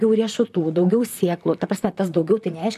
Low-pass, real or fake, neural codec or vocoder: 14.4 kHz; fake; vocoder, 44.1 kHz, 128 mel bands, Pupu-Vocoder